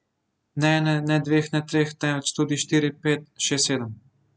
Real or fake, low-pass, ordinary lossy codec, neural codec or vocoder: real; none; none; none